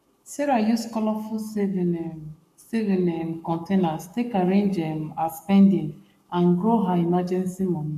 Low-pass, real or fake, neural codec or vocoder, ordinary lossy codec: 14.4 kHz; fake; codec, 44.1 kHz, 7.8 kbps, Pupu-Codec; none